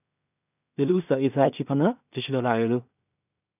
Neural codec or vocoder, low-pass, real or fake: codec, 16 kHz in and 24 kHz out, 0.4 kbps, LongCat-Audio-Codec, two codebook decoder; 3.6 kHz; fake